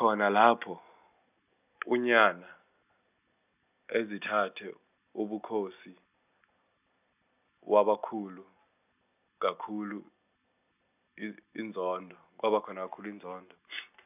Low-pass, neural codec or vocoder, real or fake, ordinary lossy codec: 3.6 kHz; none; real; none